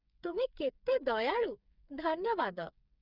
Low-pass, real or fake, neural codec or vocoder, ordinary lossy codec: 5.4 kHz; fake; codec, 16 kHz, 4 kbps, FreqCodec, smaller model; none